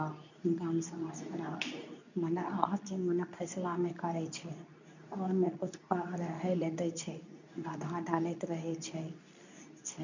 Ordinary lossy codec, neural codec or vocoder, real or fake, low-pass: none; codec, 24 kHz, 0.9 kbps, WavTokenizer, medium speech release version 2; fake; 7.2 kHz